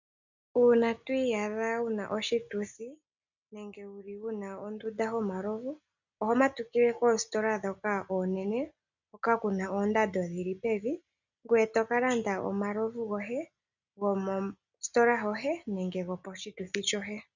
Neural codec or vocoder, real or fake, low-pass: none; real; 7.2 kHz